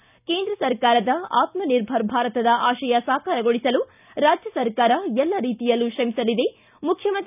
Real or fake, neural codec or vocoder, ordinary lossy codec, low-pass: real; none; none; 3.6 kHz